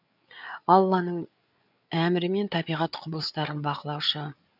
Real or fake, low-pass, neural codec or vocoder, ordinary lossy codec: fake; 5.4 kHz; codec, 16 kHz, 8 kbps, FreqCodec, larger model; none